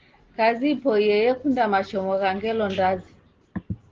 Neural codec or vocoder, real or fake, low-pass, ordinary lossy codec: none; real; 7.2 kHz; Opus, 16 kbps